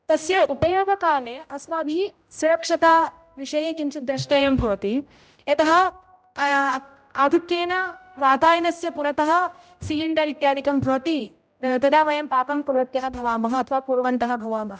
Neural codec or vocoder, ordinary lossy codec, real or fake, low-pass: codec, 16 kHz, 0.5 kbps, X-Codec, HuBERT features, trained on general audio; none; fake; none